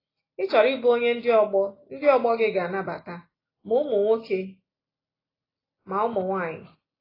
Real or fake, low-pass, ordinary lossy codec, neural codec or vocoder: real; 5.4 kHz; AAC, 24 kbps; none